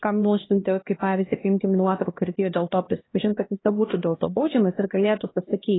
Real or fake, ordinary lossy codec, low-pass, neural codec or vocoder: fake; AAC, 16 kbps; 7.2 kHz; codec, 16 kHz, 1 kbps, X-Codec, HuBERT features, trained on LibriSpeech